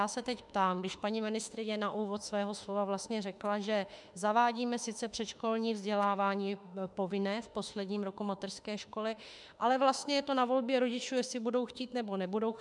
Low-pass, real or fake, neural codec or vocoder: 10.8 kHz; fake; autoencoder, 48 kHz, 32 numbers a frame, DAC-VAE, trained on Japanese speech